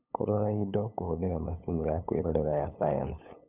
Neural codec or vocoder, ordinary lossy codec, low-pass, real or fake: codec, 16 kHz, 8 kbps, FunCodec, trained on LibriTTS, 25 frames a second; none; 3.6 kHz; fake